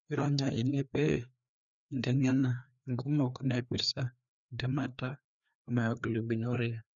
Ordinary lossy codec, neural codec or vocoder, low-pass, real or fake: none; codec, 16 kHz, 2 kbps, FreqCodec, larger model; 7.2 kHz; fake